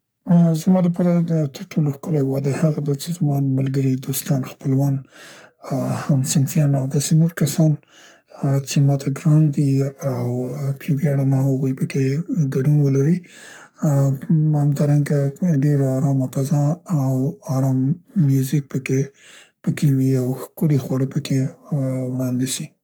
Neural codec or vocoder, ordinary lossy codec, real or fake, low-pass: codec, 44.1 kHz, 3.4 kbps, Pupu-Codec; none; fake; none